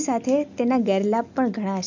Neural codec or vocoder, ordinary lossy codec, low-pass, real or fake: none; none; 7.2 kHz; real